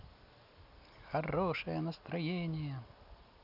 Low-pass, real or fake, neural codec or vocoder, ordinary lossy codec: 5.4 kHz; real; none; none